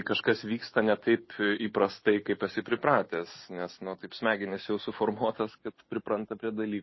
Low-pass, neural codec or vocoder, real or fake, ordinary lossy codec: 7.2 kHz; none; real; MP3, 24 kbps